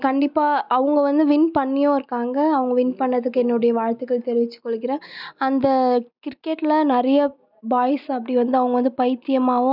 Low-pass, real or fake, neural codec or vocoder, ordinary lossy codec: 5.4 kHz; real; none; none